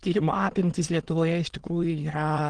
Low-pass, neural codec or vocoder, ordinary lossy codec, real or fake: 9.9 kHz; autoencoder, 22.05 kHz, a latent of 192 numbers a frame, VITS, trained on many speakers; Opus, 16 kbps; fake